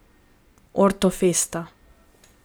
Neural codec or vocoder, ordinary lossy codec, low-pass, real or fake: none; none; none; real